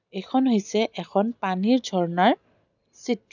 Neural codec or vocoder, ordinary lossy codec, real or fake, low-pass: none; none; real; 7.2 kHz